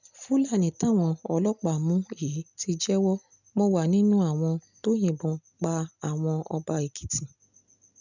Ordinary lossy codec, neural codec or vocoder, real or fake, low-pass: none; none; real; 7.2 kHz